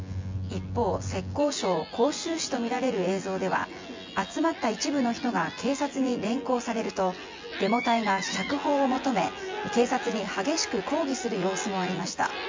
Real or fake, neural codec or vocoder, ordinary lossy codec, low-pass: fake; vocoder, 24 kHz, 100 mel bands, Vocos; MP3, 48 kbps; 7.2 kHz